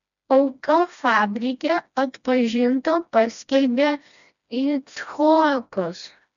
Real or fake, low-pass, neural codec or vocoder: fake; 7.2 kHz; codec, 16 kHz, 1 kbps, FreqCodec, smaller model